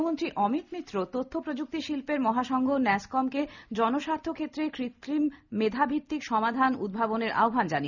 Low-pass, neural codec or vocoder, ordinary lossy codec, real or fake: 7.2 kHz; none; none; real